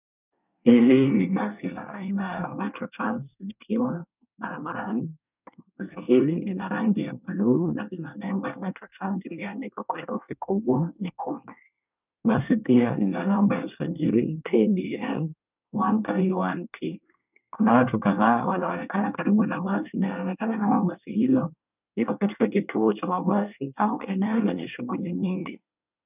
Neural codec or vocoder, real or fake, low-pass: codec, 24 kHz, 1 kbps, SNAC; fake; 3.6 kHz